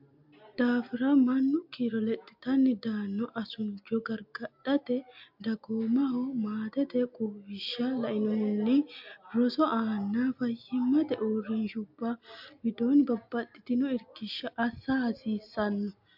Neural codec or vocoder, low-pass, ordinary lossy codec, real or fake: none; 5.4 kHz; MP3, 48 kbps; real